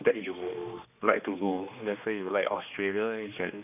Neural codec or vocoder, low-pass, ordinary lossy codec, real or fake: codec, 16 kHz, 2 kbps, X-Codec, HuBERT features, trained on general audio; 3.6 kHz; none; fake